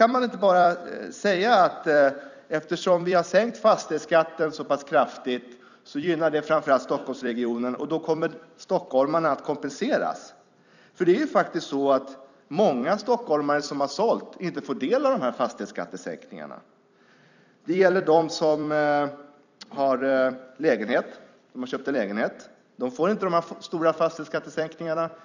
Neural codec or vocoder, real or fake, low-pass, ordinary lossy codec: vocoder, 44.1 kHz, 128 mel bands every 256 samples, BigVGAN v2; fake; 7.2 kHz; none